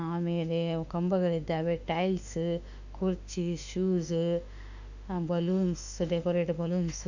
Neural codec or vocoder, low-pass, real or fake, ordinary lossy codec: autoencoder, 48 kHz, 32 numbers a frame, DAC-VAE, trained on Japanese speech; 7.2 kHz; fake; none